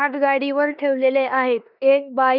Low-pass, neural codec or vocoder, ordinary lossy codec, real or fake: 5.4 kHz; codec, 16 kHz in and 24 kHz out, 0.9 kbps, LongCat-Audio-Codec, four codebook decoder; none; fake